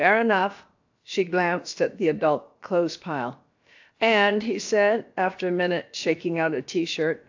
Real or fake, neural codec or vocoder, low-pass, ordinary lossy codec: fake; codec, 16 kHz, about 1 kbps, DyCAST, with the encoder's durations; 7.2 kHz; AAC, 48 kbps